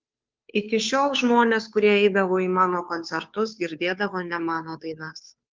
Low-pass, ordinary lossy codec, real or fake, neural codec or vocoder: 7.2 kHz; Opus, 32 kbps; fake; codec, 16 kHz, 2 kbps, FunCodec, trained on Chinese and English, 25 frames a second